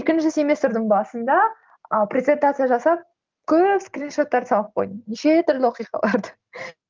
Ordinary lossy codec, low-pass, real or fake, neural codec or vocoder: Opus, 32 kbps; 7.2 kHz; real; none